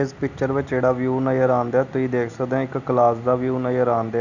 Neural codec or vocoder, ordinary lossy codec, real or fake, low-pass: none; none; real; 7.2 kHz